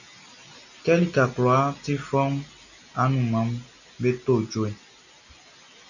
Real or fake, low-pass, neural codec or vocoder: real; 7.2 kHz; none